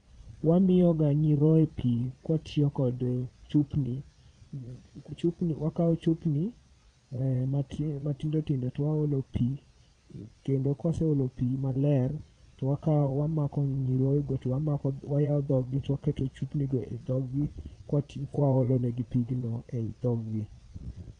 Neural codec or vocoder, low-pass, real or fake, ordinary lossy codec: vocoder, 22.05 kHz, 80 mel bands, Vocos; 9.9 kHz; fake; none